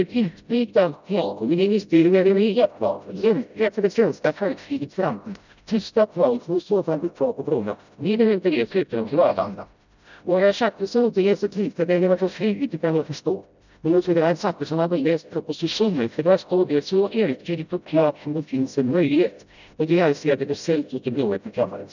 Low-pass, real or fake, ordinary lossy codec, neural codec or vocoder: 7.2 kHz; fake; none; codec, 16 kHz, 0.5 kbps, FreqCodec, smaller model